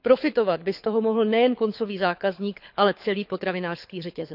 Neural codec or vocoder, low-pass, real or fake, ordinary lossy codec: codec, 24 kHz, 6 kbps, HILCodec; 5.4 kHz; fake; none